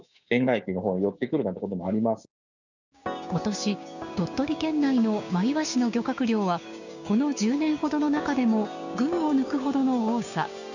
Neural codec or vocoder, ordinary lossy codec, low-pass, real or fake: codec, 16 kHz, 6 kbps, DAC; none; 7.2 kHz; fake